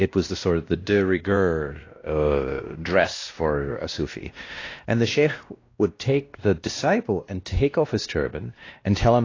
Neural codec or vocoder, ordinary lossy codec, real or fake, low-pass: codec, 16 kHz, 1 kbps, X-Codec, WavLM features, trained on Multilingual LibriSpeech; AAC, 32 kbps; fake; 7.2 kHz